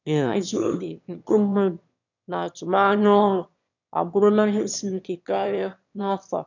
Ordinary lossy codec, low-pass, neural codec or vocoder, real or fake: none; 7.2 kHz; autoencoder, 22.05 kHz, a latent of 192 numbers a frame, VITS, trained on one speaker; fake